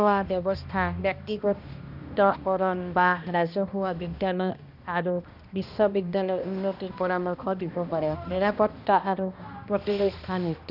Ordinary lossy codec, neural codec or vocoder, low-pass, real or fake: none; codec, 16 kHz, 1 kbps, X-Codec, HuBERT features, trained on balanced general audio; 5.4 kHz; fake